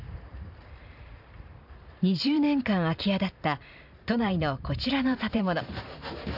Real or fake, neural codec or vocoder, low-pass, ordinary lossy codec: fake; vocoder, 44.1 kHz, 128 mel bands every 512 samples, BigVGAN v2; 5.4 kHz; none